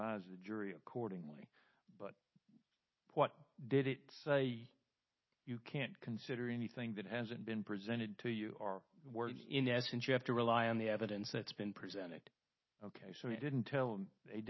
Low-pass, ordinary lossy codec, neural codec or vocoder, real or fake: 7.2 kHz; MP3, 24 kbps; none; real